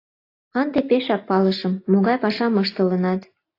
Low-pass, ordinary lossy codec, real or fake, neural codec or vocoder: 5.4 kHz; AAC, 32 kbps; real; none